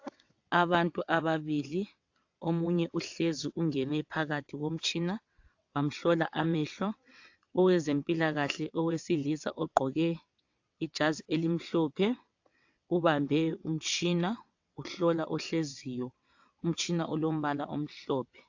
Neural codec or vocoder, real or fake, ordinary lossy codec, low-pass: vocoder, 22.05 kHz, 80 mel bands, WaveNeXt; fake; AAC, 48 kbps; 7.2 kHz